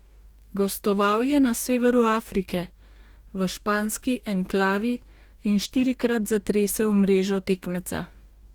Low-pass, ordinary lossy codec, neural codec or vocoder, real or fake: 19.8 kHz; Opus, 64 kbps; codec, 44.1 kHz, 2.6 kbps, DAC; fake